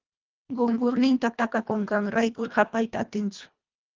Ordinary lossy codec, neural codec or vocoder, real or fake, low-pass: Opus, 32 kbps; codec, 24 kHz, 1.5 kbps, HILCodec; fake; 7.2 kHz